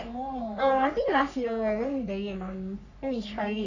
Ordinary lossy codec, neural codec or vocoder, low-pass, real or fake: none; codec, 44.1 kHz, 3.4 kbps, Pupu-Codec; 7.2 kHz; fake